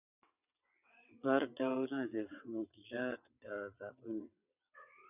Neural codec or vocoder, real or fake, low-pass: vocoder, 22.05 kHz, 80 mel bands, WaveNeXt; fake; 3.6 kHz